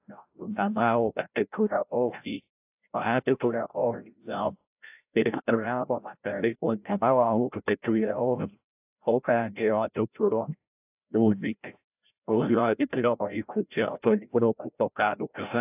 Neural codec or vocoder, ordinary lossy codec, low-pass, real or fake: codec, 16 kHz, 0.5 kbps, FreqCodec, larger model; AAC, 32 kbps; 3.6 kHz; fake